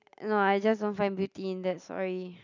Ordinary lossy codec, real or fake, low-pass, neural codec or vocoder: none; real; 7.2 kHz; none